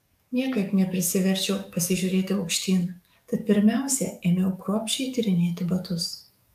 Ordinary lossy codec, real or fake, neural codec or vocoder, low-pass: AAC, 96 kbps; fake; codec, 44.1 kHz, 7.8 kbps, DAC; 14.4 kHz